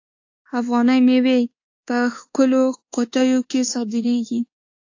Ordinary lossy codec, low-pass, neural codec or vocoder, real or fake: AAC, 48 kbps; 7.2 kHz; codec, 24 kHz, 1.2 kbps, DualCodec; fake